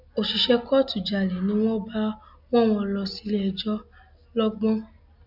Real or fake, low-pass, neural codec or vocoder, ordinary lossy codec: real; 5.4 kHz; none; none